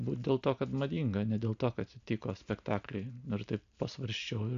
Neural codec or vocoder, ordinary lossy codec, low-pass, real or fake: none; AAC, 96 kbps; 7.2 kHz; real